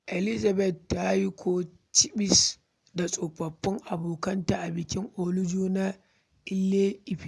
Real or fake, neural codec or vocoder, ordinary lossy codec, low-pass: real; none; none; none